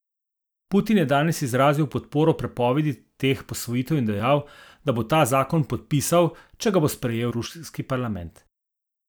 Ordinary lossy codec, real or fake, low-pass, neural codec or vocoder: none; real; none; none